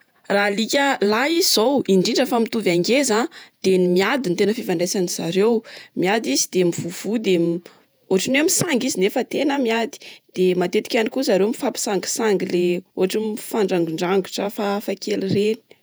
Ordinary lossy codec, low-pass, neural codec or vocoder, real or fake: none; none; vocoder, 48 kHz, 128 mel bands, Vocos; fake